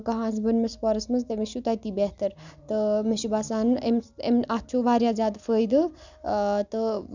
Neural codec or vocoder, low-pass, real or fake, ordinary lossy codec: none; 7.2 kHz; real; none